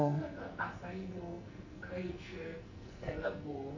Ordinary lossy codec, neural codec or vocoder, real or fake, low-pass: none; codec, 44.1 kHz, 2.6 kbps, SNAC; fake; 7.2 kHz